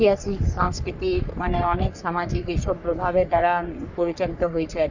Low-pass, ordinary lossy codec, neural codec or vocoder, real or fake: 7.2 kHz; none; codec, 44.1 kHz, 3.4 kbps, Pupu-Codec; fake